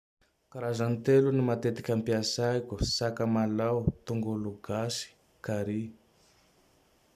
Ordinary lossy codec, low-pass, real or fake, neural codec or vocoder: none; 14.4 kHz; real; none